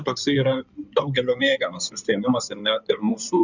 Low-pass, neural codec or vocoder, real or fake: 7.2 kHz; codec, 16 kHz in and 24 kHz out, 2.2 kbps, FireRedTTS-2 codec; fake